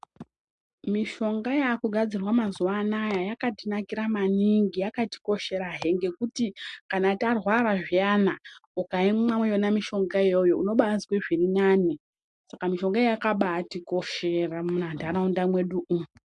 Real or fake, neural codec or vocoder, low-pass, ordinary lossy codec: real; none; 10.8 kHz; MP3, 64 kbps